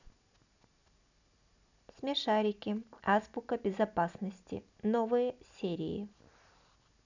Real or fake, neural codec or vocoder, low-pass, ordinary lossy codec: real; none; 7.2 kHz; none